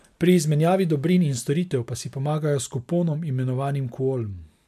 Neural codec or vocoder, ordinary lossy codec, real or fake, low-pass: none; MP3, 96 kbps; real; 14.4 kHz